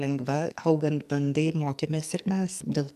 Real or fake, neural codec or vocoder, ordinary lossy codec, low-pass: fake; codec, 44.1 kHz, 2.6 kbps, SNAC; MP3, 96 kbps; 14.4 kHz